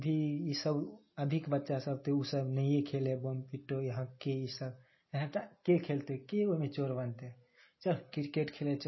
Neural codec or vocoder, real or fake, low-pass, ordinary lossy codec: none; real; 7.2 kHz; MP3, 24 kbps